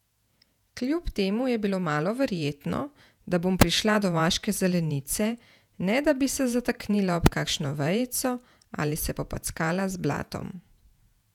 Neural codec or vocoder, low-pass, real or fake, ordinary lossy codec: vocoder, 48 kHz, 128 mel bands, Vocos; 19.8 kHz; fake; none